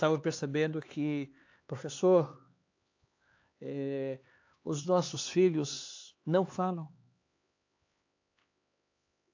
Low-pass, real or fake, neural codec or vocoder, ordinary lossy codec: 7.2 kHz; fake; codec, 16 kHz, 4 kbps, X-Codec, HuBERT features, trained on LibriSpeech; AAC, 48 kbps